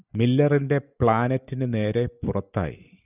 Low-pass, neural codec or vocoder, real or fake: 3.6 kHz; none; real